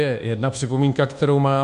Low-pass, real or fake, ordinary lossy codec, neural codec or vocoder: 10.8 kHz; fake; MP3, 64 kbps; codec, 24 kHz, 1.2 kbps, DualCodec